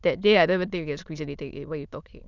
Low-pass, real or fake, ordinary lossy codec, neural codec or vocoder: 7.2 kHz; fake; none; autoencoder, 22.05 kHz, a latent of 192 numbers a frame, VITS, trained on many speakers